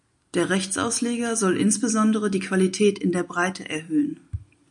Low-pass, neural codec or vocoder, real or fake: 10.8 kHz; none; real